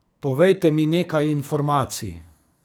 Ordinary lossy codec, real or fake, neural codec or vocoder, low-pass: none; fake; codec, 44.1 kHz, 2.6 kbps, SNAC; none